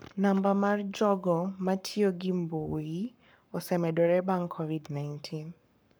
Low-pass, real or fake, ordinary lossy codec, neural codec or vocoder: none; fake; none; codec, 44.1 kHz, 7.8 kbps, Pupu-Codec